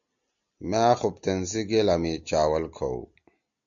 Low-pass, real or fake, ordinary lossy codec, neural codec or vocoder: 7.2 kHz; real; MP3, 96 kbps; none